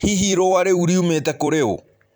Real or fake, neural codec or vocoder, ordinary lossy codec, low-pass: fake; vocoder, 44.1 kHz, 128 mel bands every 256 samples, BigVGAN v2; none; none